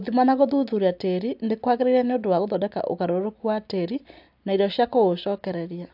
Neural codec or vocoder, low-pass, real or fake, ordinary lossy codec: none; 5.4 kHz; real; AAC, 48 kbps